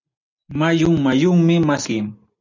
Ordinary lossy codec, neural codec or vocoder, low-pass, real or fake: MP3, 64 kbps; none; 7.2 kHz; real